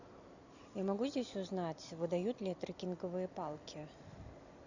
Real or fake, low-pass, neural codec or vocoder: real; 7.2 kHz; none